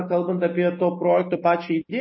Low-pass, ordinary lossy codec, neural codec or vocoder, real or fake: 7.2 kHz; MP3, 24 kbps; none; real